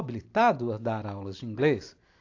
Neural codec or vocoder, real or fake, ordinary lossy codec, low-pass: none; real; AAC, 48 kbps; 7.2 kHz